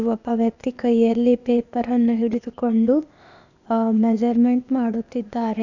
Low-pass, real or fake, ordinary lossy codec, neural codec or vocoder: 7.2 kHz; fake; none; codec, 16 kHz, 0.8 kbps, ZipCodec